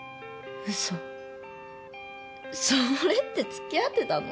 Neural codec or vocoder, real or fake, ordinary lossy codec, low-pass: none; real; none; none